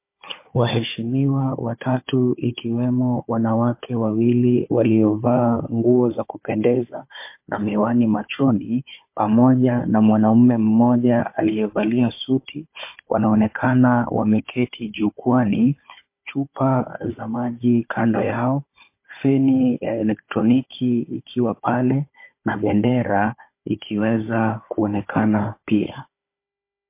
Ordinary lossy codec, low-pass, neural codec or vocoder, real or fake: MP3, 24 kbps; 3.6 kHz; codec, 16 kHz, 4 kbps, FunCodec, trained on Chinese and English, 50 frames a second; fake